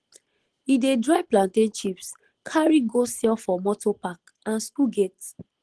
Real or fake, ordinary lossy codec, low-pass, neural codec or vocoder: real; Opus, 16 kbps; 10.8 kHz; none